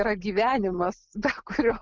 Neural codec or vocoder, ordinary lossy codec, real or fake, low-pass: none; Opus, 24 kbps; real; 7.2 kHz